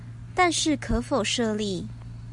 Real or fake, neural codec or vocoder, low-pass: real; none; 10.8 kHz